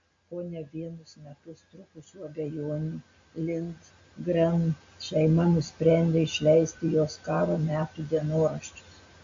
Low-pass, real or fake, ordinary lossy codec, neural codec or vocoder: 7.2 kHz; real; MP3, 48 kbps; none